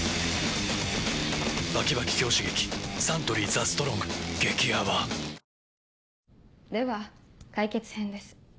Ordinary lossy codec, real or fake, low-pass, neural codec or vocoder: none; real; none; none